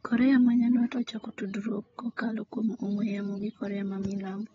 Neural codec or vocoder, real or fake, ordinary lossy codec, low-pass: codec, 16 kHz, 16 kbps, FreqCodec, larger model; fake; AAC, 24 kbps; 7.2 kHz